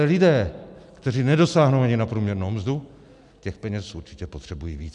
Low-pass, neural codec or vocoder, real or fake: 10.8 kHz; none; real